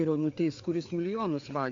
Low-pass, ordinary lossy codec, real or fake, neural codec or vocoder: 7.2 kHz; MP3, 48 kbps; fake; codec, 16 kHz, 4 kbps, FreqCodec, larger model